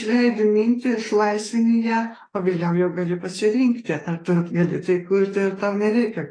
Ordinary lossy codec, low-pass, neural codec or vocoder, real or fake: AAC, 32 kbps; 9.9 kHz; autoencoder, 48 kHz, 32 numbers a frame, DAC-VAE, trained on Japanese speech; fake